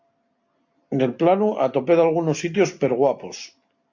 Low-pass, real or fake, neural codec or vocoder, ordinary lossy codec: 7.2 kHz; real; none; AAC, 48 kbps